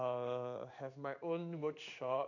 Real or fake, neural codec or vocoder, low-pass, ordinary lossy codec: fake; codec, 16 kHz in and 24 kHz out, 1 kbps, XY-Tokenizer; 7.2 kHz; none